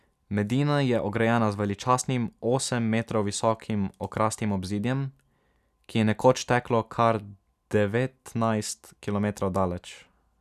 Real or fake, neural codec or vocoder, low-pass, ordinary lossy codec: real; none; 14.4 kHz; none